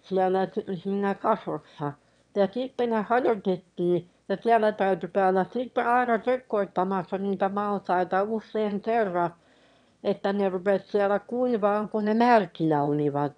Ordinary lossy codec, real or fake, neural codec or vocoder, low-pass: none; fake; autoencoder, 22.05 kHz, a latent of 192 numbers a frame, VITS, trained on one speaker; 9.9 kHz